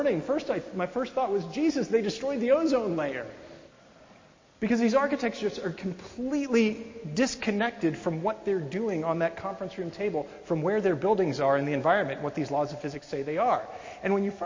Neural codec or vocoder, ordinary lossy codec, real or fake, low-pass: none; MP3, 32 kbps; real; 7.2 kHz